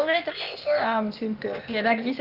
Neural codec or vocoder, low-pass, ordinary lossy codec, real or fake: codec, 16 kHz, 0.8 kbps, ZipCodec; 5.4 kHz; Opus, 24 kbps; fake